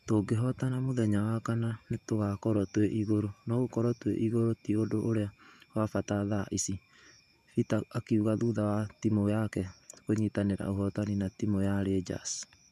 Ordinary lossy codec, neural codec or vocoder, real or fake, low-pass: none; vocoder, 48 kHz, 128 mel bands, Vocos; fake; 14.4 kHz